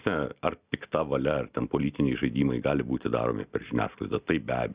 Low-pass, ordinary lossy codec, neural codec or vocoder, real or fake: 3.6 kHz; Opus, 64 kbps; none; real